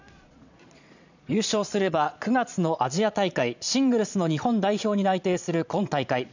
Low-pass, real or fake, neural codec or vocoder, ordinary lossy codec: 7.2 kHz; fake; vocoder, 22.05 kHz, 80 mel bands, Vocos; none